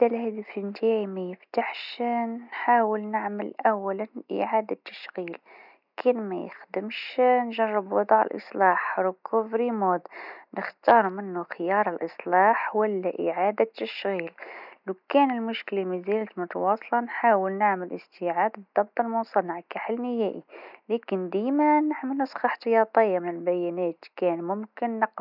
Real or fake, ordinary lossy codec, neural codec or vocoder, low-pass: real; none; none; 5.4 kHz